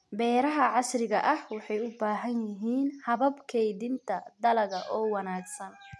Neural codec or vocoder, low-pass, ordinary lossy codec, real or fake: none; none; none; real